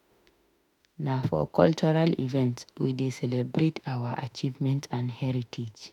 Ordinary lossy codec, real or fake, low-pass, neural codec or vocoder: none; fake; 19.8 kHz; autoencoder, 48 kHz, 32 numbers a frame, DAC-VAE, trained on Japanese speech